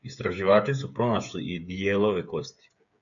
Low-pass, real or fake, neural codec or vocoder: 7.2 kHz; fake; codec, 16 kHz, 8 kbps, FreqCodec, smaller model